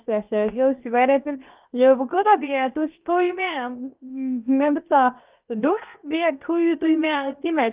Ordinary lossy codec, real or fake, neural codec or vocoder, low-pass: Opus, 24 kbps; fake; codec, 16 kHz, 0.7 kbps, FocalCodec; 3.6 kHz